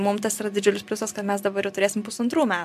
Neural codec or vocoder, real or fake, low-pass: none; real; 14.4 kHz